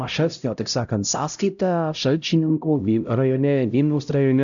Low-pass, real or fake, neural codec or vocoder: 7.2 kHz; fake; codec, 16 kHz, 0.5 kbps, X-Codec, HuBERT features, trained on LibriSpeech